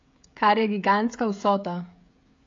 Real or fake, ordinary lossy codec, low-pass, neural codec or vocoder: fake; AAC, 48 kbps; 7.2 kHz; codec, 16 kHz, 16 kbps, FreqCodec, smaller model